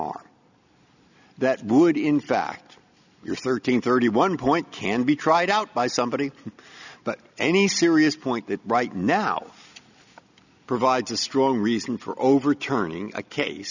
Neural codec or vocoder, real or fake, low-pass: none; real; 7.2 kHz